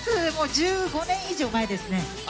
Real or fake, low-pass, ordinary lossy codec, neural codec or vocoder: real; none; none; none